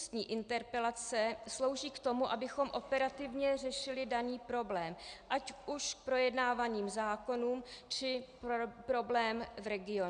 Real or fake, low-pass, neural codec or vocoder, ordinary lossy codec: real; 9.9 kHz; none; Opus, 64 kbps